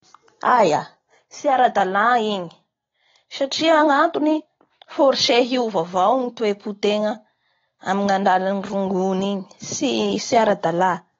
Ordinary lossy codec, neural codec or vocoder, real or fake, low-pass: AAC, 24 kbps; none; real; 7.2 kHz